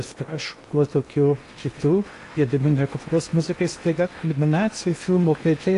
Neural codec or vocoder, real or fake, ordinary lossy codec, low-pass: codec, 16 kHz in and 24 kHz out, 0.8 kbps, FocalCodec, streaming, 65536 codes; fake; AAC, 48 kbps; 10.8 kHz